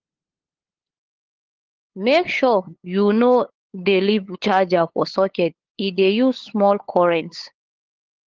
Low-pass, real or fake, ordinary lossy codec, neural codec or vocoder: 7.2 kHz; fake; Opus, 16 kbps; codec, 16 kHz, 8 kbps, FunCodec, trained on LibriTTS, 25 frames a second